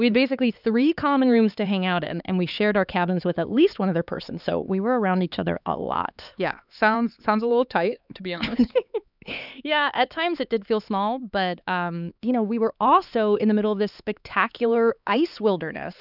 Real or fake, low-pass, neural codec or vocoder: fake; 5.4 kHz; codec, 16 kHz, 4 kbps, X-Codec, HuBERT features, trained on LibriSpeech